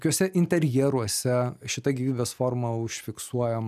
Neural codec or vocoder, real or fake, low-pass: none; real; 14.4 kHz